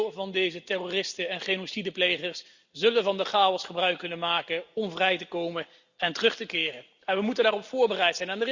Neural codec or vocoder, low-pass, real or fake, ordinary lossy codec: none; 7.2 kHz; real; Opus, 64 kbps